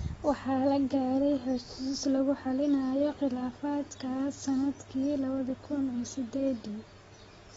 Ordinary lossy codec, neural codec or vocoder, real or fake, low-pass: AAC, 24 kbps; none; real; 10.8 kHz